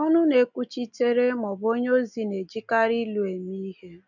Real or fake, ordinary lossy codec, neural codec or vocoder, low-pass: real; none; none; 7.2 kHz